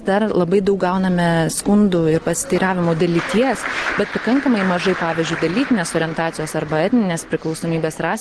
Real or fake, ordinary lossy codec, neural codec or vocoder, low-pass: real; Opus, 16 kbps; none; 10.8 kHz